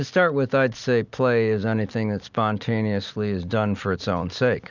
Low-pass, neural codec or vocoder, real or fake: 7.2 kHz; none; real